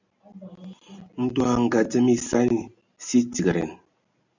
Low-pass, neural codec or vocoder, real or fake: 7.2 kHz; none; real